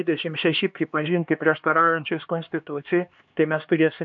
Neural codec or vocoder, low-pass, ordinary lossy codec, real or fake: codec, 16 kHz, 2 kbps, X-Codec, HuBERT features, trained on LibriSpeech; 7.2 kHz; AAC, 64 kbps; fake